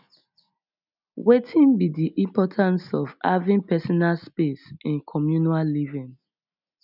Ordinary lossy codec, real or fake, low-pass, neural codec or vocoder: none; real; 5.4 kHz; none